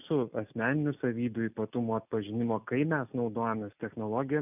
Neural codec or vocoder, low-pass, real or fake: none; 3.6 kHz; real